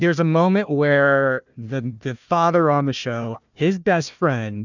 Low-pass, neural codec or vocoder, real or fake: 7.2 kHz; codec, 16 kHz, 1 kbps, FunCodec, trained on Chinese and English, 50 frames a second; fake